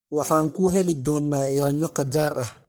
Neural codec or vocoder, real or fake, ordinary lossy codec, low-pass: codec, 44.1 kHz, 1.7 kbps, Pupu-Codec; fake; none; none